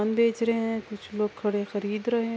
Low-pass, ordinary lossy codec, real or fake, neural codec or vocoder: none; none; real; none